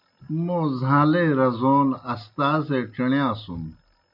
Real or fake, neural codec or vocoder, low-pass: real; none; 5.4 kHz